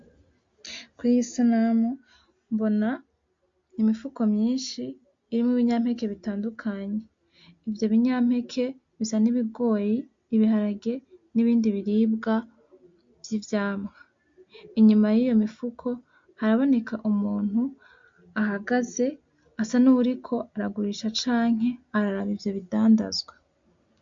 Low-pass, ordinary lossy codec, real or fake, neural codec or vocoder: 7.2 kHz; MP3, 48 kbps; real; none